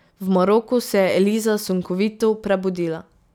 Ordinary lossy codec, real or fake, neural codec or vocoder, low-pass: none; real; none; none